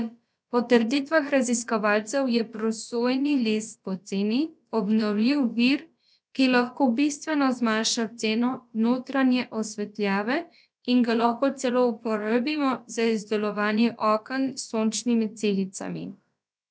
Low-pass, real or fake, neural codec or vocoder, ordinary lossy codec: none; fake; codec, 16 kHz, about 1 kbps, DyCAST, with the encoder's durations; none